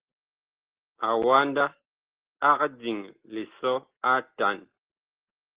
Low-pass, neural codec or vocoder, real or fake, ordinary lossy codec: 3.6 kHz; none; real; Opus, 16 kbps